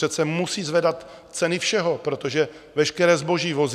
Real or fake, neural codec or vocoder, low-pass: real; none; 14.4 kHz